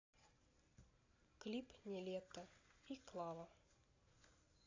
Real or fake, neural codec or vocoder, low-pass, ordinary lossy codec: real; none; 7.2 kHz; AAC, 32 kbps